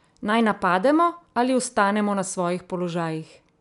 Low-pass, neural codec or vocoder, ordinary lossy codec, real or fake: 10.8 kHz; none; none; real